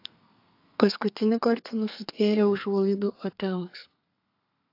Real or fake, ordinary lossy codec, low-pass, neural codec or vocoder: fake; AAC, 32 kbps; 5.4 kHz; codec, 32 kHz, 1.9 kbps, SNAC